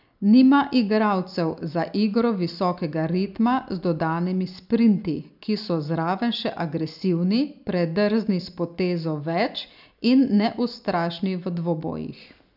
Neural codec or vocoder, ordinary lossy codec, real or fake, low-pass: none; none; real; 5.4 kHz